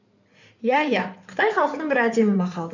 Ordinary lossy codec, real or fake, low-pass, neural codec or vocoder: none; fake; 7.2 kHz; codec, 16 kHz in and 24 kHz out, 2.2 kbps, FireRedTTS-2 codec